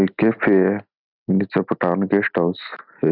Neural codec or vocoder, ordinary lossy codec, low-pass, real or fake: none; none; 5.4 kHz; real